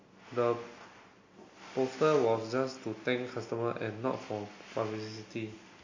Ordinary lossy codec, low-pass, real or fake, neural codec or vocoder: MP3, 32 kbps; 7.2 kHz; real; none